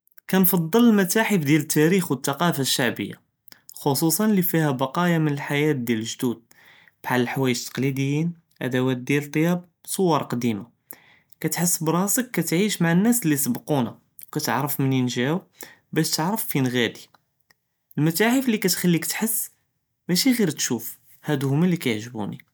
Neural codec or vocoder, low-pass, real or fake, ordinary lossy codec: none; none; real; none